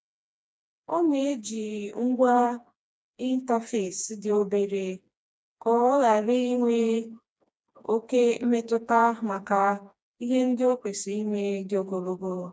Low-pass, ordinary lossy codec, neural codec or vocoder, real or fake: none; none; codec, 16 kHz, 2 kbps, FreqCodec, smaller model; fake